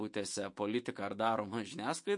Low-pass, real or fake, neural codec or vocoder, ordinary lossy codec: 10.8 kHz; fake; autoencoder, 48 kHz, 128 numbers a frame, DAC-VAE, trained on Japanese speech; MP3, 48 kbps